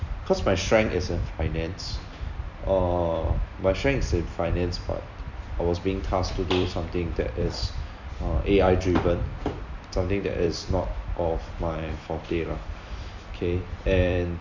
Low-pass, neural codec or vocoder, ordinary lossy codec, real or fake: 7.2 kHz; vocoder, 44.1 kHz, 128 mel bands every 256 samples, BigVGAN v2; none; fake